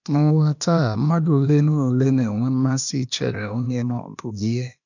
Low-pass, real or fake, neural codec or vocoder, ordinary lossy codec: 7.2 kHz; fake; codec, 16 kHz, 0.8 kbps, ZipCodec; none